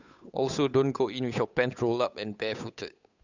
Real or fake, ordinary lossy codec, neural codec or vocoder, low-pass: fake; none; codec, 16 kHz, 8 kbps, FunCodec, trained on Chinese and English, 25 frames a second; 7.2 kHz